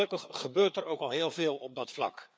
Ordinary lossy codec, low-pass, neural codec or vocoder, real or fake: none; none; codec, 16 kHz, 4 kbps, FreqCodec, larger model; fake